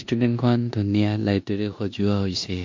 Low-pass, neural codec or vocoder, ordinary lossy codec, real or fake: 7.2 kHz; codec, 16 kHz in and 24 kHz out, 0.9 kbps, LongCat-Audio-Codec, four codebook decoder; MP3, 48 kbps; fake